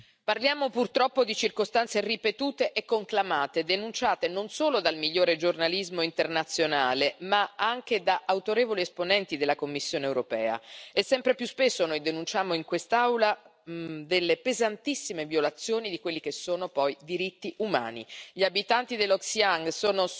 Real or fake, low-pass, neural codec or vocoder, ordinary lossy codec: real; none; none; none